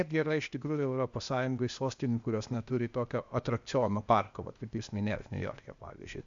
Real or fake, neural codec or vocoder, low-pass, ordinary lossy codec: fake; codec, 16 kHz, 0.8 kbps, ZipCodec; 7.2 kHz; MP3, 64 kbps